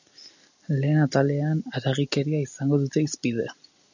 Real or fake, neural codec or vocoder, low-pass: real; none; 7.2 kHz